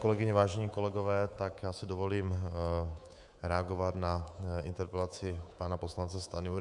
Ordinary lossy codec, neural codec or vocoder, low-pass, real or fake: Opus, 64 kbps; codec, 24 kHz, 3.1 kbps, DualCodec; 10.8 kHz; fake